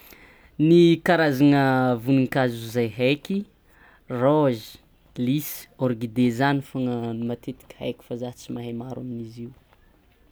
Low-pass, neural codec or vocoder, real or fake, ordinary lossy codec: none; none; real; none